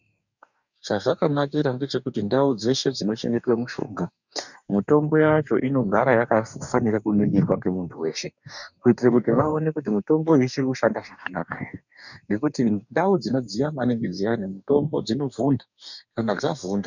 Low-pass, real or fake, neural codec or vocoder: 7.2 kHz; fake; codec, 44.1 kHz, 2.6 kbps, DAC